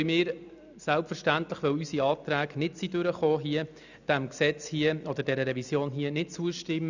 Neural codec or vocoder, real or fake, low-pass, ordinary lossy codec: none; real; 7.2 kHz; none